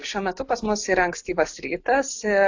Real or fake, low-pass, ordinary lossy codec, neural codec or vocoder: real; 7.2 kHz; AAC, 48 kbps; none